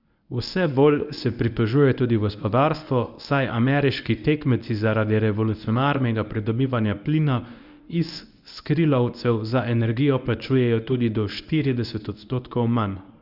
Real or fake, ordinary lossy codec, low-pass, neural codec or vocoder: fake; Opus, 64 kbps; 5.4 kHz; codec, 24 kHz, 0.9 kbps, WavTokenizer, medium speech release version 1